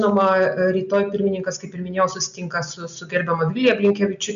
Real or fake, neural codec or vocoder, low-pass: real; none; 7.2 kHz